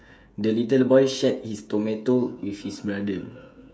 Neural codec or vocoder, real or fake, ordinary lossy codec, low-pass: codec, 16 kHz, 16 kbps, FreqCodec, smaller model; fake; none; none